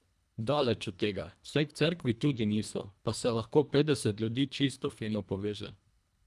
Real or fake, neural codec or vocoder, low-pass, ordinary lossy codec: fake; codec, 24 kHz, 1.5 kbps, HILCodec; none; none